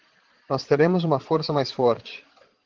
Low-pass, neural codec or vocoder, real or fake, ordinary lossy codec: 7.2 kHz; vocoder, 22.05 kHz, 80 mel bands, Vocos; fake; Opus, 16 kbps